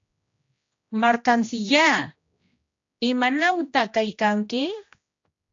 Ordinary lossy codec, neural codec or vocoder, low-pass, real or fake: AAC, 48 kbps; codec, 16 kHz, 1 kbps, X-Codec, HuBERT features, trained on general audio; 7.2 kHz; fake